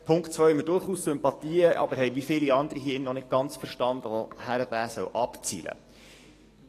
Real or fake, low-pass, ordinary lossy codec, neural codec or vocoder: fake; 14.4 kHz; AAC, 48 kbps; codec, 44.1 kHz, 7.8 kbps, DAC